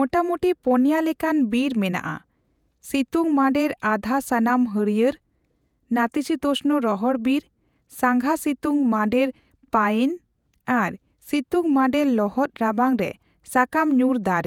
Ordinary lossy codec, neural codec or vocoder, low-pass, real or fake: none; vocoder, 48 kHz, 128 mel bands, Vocos; 19.8 kHz; fake